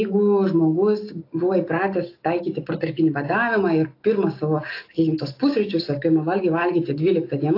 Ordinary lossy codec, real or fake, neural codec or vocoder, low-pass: AAC, 32 kbps; real; none; 5.4 kHz